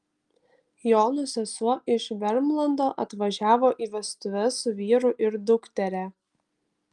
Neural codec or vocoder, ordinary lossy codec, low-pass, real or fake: none; Opus, 32 kbps; 10.8 kHz; real